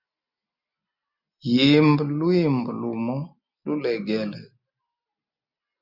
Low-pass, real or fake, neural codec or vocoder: 5.4 kHz; real; none